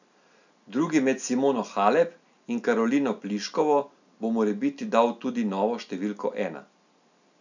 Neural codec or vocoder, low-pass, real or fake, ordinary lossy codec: none; 7.2 kHz; real; none